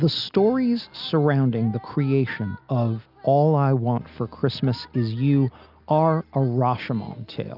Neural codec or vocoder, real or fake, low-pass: none; real; 5.4 kHz